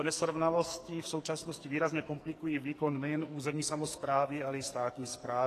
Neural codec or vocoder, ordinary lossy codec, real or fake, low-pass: codec, 44.1 kHz, 2.6 kbps, SNAC; AAC, 48 kbps; fake; 14.4 kHz